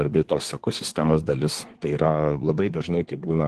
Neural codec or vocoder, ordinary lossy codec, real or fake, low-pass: codec, 24 kHz, 1 kbps, SNAC; Opus, 16 kbps; fake; 10.8 kHz